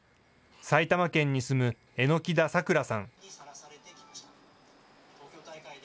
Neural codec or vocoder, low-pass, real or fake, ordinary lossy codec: none; none; real; none